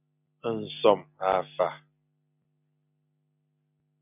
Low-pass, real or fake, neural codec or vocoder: 3.6 kHz; real; none